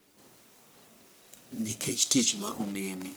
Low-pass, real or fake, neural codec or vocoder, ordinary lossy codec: none; fake; codec, 44.1 kHz, 1.7 kbps, Pupu-Codec; none